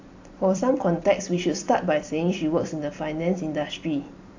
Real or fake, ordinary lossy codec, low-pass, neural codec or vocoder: fake; AAC, 48 kbps; 7.2 kHz; vocoder, 44.1 kHz, 128 mel bands every 256 samples, BigVGAN v2